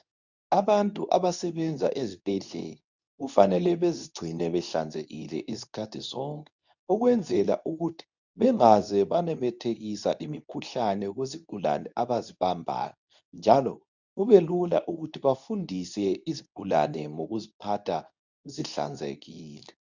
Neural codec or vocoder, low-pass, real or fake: codec, 24 kHz, 0.9 kbps, WavTokenizer, medium speech release version 2; 7.2 kHz; fake